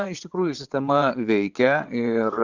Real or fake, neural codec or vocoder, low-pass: fake; vocoder, 22.05 kHz, 80 mel bands, WaveNeXt; 7.2 kHz